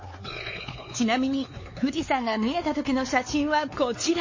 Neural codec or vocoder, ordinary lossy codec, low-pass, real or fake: codec, 16 kHz, 4 kbps, X-Codec, WavLM features, trained on Multilingual LibriSpeech; MP3, 32 kbps; 7.2 kHz; fake